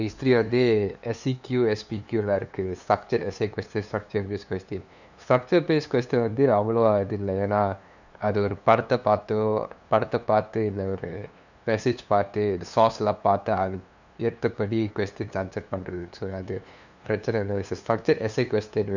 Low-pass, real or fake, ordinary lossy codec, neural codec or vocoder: 7.2 kHz; fake; none; codec, 16 kHz, 2 kbps, FunCodec, trained on LibriTTS, 25 frames a second